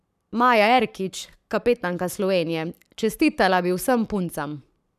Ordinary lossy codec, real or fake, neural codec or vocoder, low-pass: none; fake; codec, 44.1 kHz, 7.8 kbps, Pupu-Codec; 14.4 kHz